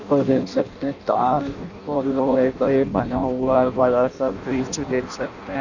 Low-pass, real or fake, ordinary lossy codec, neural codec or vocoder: 7.2 kHz; fake; none; codec, 16 kHz in and 24 kHz out, 0.6 kbps, FireRedTTS-2 codec